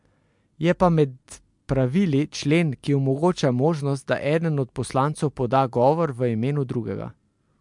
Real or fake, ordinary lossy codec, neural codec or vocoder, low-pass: real; MP3, 64 kbps; none; 10.8 kHz